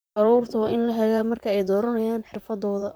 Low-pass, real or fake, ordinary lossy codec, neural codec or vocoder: none; fake; none; vocoder, 44.1 kHz, 128 mel bands, Pupu-Vocoder